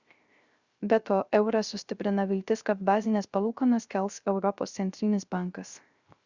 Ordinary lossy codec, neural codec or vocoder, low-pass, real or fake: Opus, 64 kbps; codec, 16 kHz, 0.3 kbps, FocalCodec; 7.2 kHz; fake